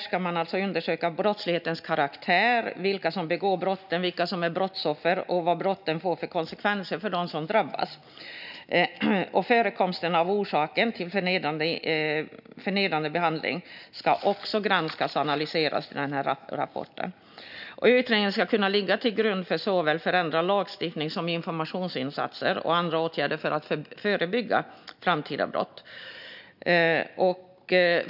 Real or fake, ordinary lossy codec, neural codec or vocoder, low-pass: real; none; none; 5.4 kHz